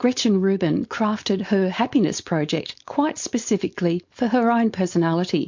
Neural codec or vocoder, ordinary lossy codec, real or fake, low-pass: codec, 16 kHz, 4.8 kbps, FACodec; MP3, 48 kbps; fake; 7.2 kHz